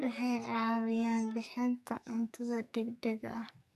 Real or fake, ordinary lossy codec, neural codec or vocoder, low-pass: fake; none; codec, 44.1 kHz, 2.6 kbps, SNAC; 14.4 kHz